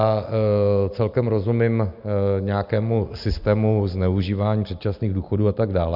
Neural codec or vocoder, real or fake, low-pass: none; real; 5.4 kHz